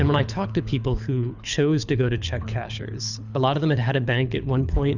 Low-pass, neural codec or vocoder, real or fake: 7.2 kHz; codec, 24 kHz, 6 kbps, HILCodec; fake